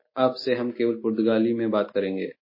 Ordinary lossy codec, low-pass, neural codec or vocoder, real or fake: MP3, 24 kbps; 5.4 kHz; none; real